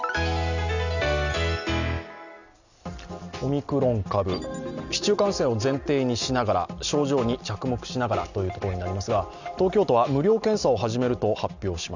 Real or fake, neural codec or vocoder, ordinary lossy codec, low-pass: real; none; none; 7.2 kHz